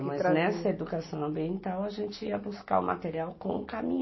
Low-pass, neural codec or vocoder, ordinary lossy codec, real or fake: 7.2 kHz; none; MP3, 24 kbps; real